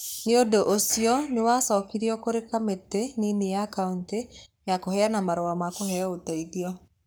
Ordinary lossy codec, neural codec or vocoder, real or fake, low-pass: none; codec, 44.1 kHz, 7.8 kbps, Pupu-Codec; fake; none